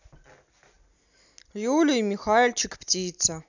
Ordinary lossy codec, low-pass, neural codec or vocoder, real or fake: none; 7.2 kHz; none; real